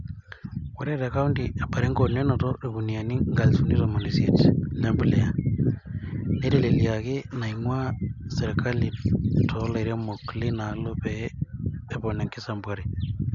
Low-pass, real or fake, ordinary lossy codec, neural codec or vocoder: 7.2 kHz; real; none; none